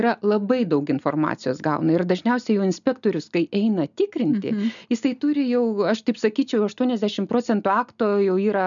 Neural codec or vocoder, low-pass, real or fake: none; 7.2 kHz; real